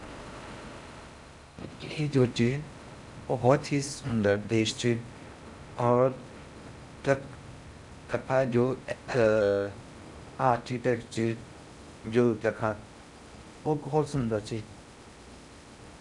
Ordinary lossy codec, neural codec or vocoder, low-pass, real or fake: none; codec, 16 kHz in and 24 kHz out, 0.6 kbps, FocalCodec, streaming, 4096 codes; 10.8 kHz; fake